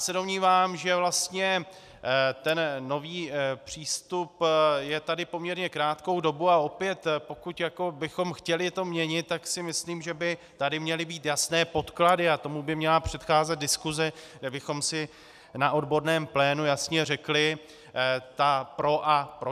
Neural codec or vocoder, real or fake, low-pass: none; real; 14.4 kHz